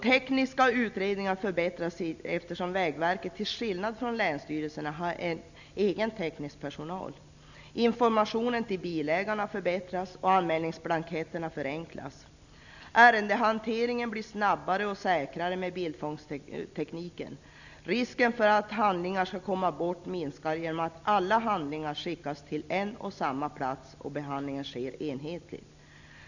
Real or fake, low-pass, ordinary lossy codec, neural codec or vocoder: real; 7.2 kHz; none; none